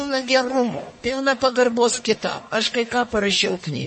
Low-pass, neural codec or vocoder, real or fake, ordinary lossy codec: 10.8 kHz; codec, 44.1 kHz, 1.7 kbps, Pupu-Codec; fake; MP3, 32 kbps